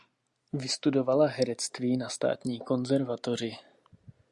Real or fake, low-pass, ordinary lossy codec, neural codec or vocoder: real; 10.8 kHz; Opus, 64 kbps; none